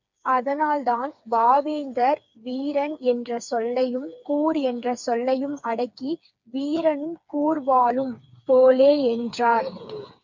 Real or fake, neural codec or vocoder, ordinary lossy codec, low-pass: fake; codec, 16 kHz, 4 kbps, FreqCodec, smaller model; MP3, 64 kbps; 7.2 kHz